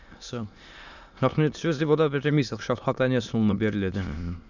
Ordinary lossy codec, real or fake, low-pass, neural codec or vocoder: none; fake; 7.2 kHz; autoencoder, 22.05 kHz, a latent of 192 numbers a frame, VITS, trained on many speakers